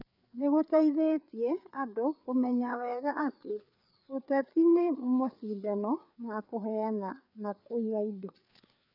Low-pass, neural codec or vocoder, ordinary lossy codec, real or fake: 5.4 kHz; codec, 16 kHz, 4 kbps, FreqCodec, larger model; none; fake